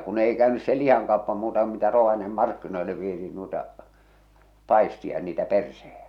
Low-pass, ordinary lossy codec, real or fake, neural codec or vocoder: 19.8 kHz; none; real; none